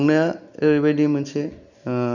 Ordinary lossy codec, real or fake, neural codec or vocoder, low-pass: none; real; none; 7.2 kHz